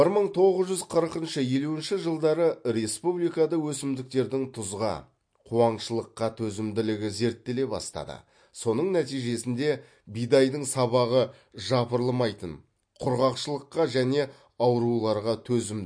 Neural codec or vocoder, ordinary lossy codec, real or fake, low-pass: none; MP3, 48 kbps; real; 9.9 kHz